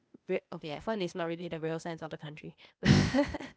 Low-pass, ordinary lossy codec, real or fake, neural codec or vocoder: none; none; fake; codec, 16 kHz, 0.8 kbps, ZipCodec